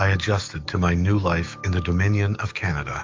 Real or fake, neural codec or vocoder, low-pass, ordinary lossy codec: fake; autoencoder, 48 kHz, 128 numbers a frame, DAC-VAE, trained on Japanese speech; 7.2 kHz; Opus, 24 kbps